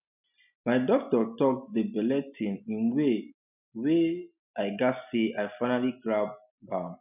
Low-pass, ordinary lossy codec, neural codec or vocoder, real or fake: 3.6 kHz; none; none; real